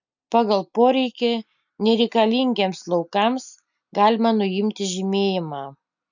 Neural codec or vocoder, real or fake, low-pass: none; real; 7.2 kHz